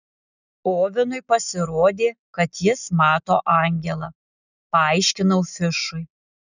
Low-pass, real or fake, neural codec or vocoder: 7.2 kHz; real; none